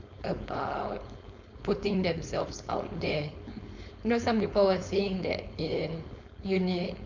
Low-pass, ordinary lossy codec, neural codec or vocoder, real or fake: 7.2 kHz; none; codec, 16 kHz, 4.8 kbps, FACodec; fake